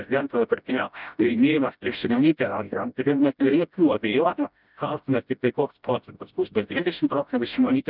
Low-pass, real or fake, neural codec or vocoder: 5.4 kHz; fake; codec, 16 kHz, 0.5 kbps, FreqCodec, smaller model